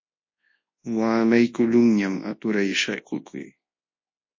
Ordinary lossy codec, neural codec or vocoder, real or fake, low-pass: MP3, 32 kbps; codec, 24 kHz, 0.9 kbps, WavTokenizer, large speech release; fake; 7.2 kHz